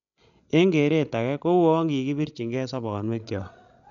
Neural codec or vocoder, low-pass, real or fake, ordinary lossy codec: codec, 16 kHz, 16 kbps, FreqCodec, larger model; 7.2 kHz; fake; none